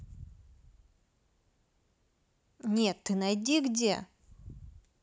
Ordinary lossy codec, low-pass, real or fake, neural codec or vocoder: none; none; real; none